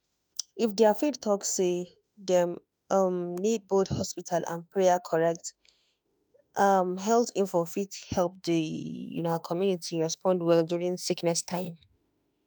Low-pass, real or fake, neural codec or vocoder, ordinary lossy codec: none; fake; autoencoder, 48 kHz, 32 numbers a frame, DAC-VAE, trained on Japanese speech; none